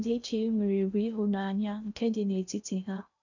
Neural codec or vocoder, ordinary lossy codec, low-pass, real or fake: codec, 16 kHz in and 24 kHz out, 0.8 kbps, FocalCodec, streaming, 65536 codes; none; 7.2 kHz; fake